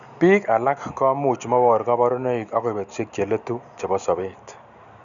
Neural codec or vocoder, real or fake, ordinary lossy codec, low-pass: none; real; none; 7.2 kHz